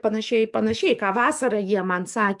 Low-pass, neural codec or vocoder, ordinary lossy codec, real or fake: 10.8 kHz; codec, 44.1 kHz, 7.8 kbps, DAC; MP3, 96 kbps; fake